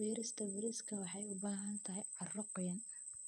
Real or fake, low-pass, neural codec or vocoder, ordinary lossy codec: fake; none; vocoder, 24 kHz, 100 mel bands, Vocos; none